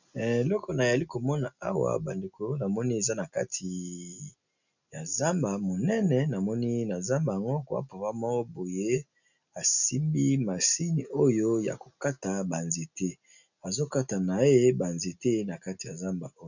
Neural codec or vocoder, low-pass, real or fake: none; 7.2 kHz; real